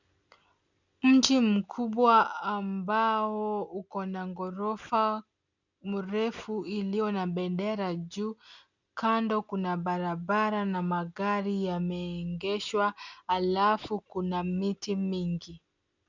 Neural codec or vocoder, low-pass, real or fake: none; 7.2 kHz; real